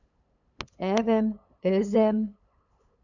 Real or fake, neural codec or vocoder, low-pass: fake; codec, 16 kHz, 8 kbps, FunCodec, trained on LibriTTS, 25 frames a second; 7.2 kHz